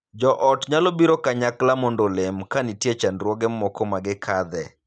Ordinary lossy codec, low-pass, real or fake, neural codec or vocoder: none; none; real; none